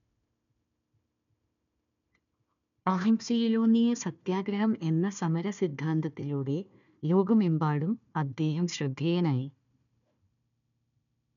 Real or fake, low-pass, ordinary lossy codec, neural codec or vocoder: fake; 7.2 kHz; none; codec, 16 kHz, 1 kbps, FunCodec, trained on Chinese and English, 50 frames a second